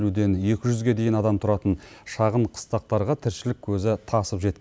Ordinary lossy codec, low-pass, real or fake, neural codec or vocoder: none; none; real; none